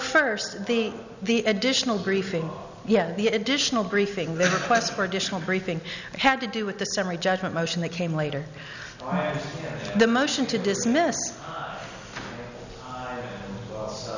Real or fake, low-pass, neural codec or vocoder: real; 7.2 kHz; none